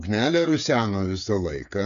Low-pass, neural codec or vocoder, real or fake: 7.2 kHz; codec, 16 kHz, 8 kbps, FreqCodec, smaller model; fake